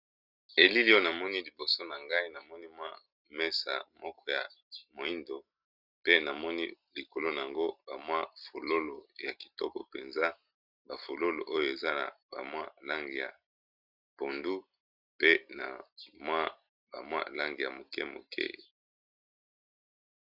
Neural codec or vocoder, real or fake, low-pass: none; real; 5.4 kHz